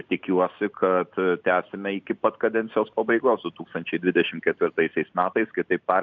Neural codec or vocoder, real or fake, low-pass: none; real; 7.2 kHz